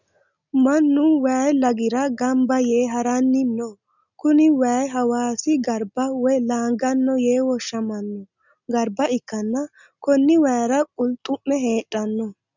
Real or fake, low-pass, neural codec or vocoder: real; 7.2 kHz; none